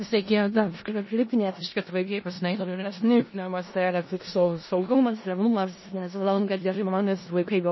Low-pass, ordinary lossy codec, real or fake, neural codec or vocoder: 7.2 kHz; MP3, 24 kbps; fake; codec, 16 kHz in and 24 kHz out, 0.4 kbps, LongCat-Audio-Codec, four codebook decoder